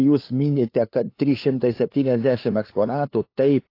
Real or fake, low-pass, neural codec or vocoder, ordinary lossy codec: fake; 5.4 kHz; codec, 16 kHz, 2 kbps, FunCodec, trained on LibriTTS, 25 frames a second; AAC, 32 kbps